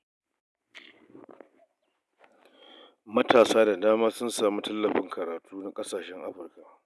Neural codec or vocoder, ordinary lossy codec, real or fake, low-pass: none; none; real; none